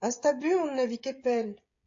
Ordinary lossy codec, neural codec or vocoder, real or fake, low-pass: AAC, 48 kbps; codec, 16 kHz, 16 kbps, FreqCodec, smaller model; fake; 7.2 kHz